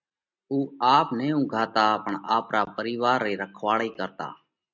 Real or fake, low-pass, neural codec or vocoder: real; 7.2 kHz; none